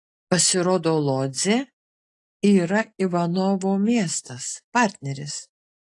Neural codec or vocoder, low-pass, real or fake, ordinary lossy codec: none; 10.8 kHz; real; AAC, 48 kbps